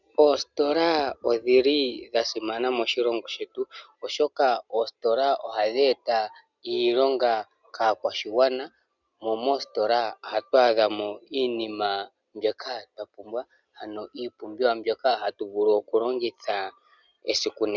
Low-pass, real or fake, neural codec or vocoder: 7.2 kHz; real; none